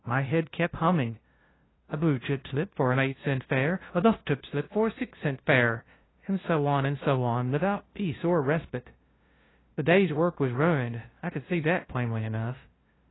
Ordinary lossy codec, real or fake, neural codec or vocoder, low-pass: AAC, 16 kbps; fake; codec, 16 kHz, 0.5 kbps, FunCodec, trained on LibriTTS, 25 frames a second; 7.2 kHz